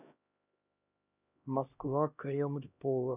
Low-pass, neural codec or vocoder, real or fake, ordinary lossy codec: 3.6 kHz; codec, 16 kHz, 1 kbps, X-Codec, HuBERT features, trained on LibriSpeech; fake; none